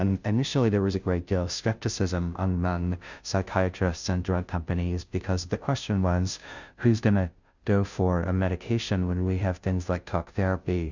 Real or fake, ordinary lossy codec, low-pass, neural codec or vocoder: fake; Opus, 64 kbps; 7.2 kHz; codec, 16 kHz, 0.5 kbps, FunCodec, trained on Chinese and English, 25 frames a second